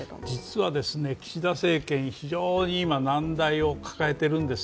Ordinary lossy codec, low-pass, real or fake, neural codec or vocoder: none; none; real; none